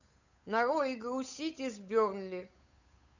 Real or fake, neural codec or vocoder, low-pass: fake; codec, 16 kHz, 8 kbps, FunCodec, trained on Chinese and English, 25 frames a second; 7.2 kHz